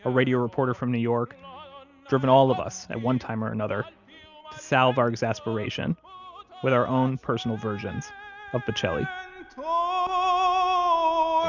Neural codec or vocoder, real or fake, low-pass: none; real; 7.2 kHz